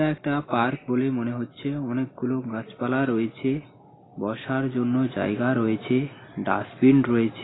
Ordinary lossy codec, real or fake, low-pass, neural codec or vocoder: AAC, 16 kbps; real; 7.2 kHz; none